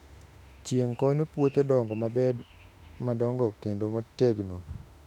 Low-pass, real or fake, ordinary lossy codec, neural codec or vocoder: 19.8 kHz; fake; none; autoencoder, 48 kHz, 32 numbers a frame, DAC-VAE, trained on Japanese speech